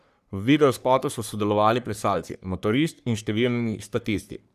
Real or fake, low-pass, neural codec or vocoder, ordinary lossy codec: fake; 14.4 kHz; codec, 44.1 kHz, 3.4 kbps, Pupu-Codec; none